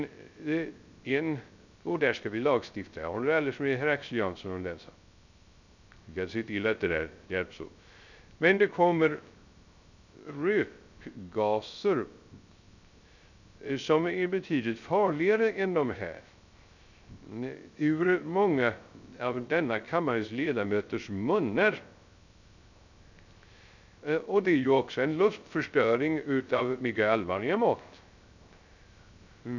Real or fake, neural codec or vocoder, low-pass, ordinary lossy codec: fake; codec, 16 kHz, 0.3 kbps, FocalCodec; 7.2 kHz; none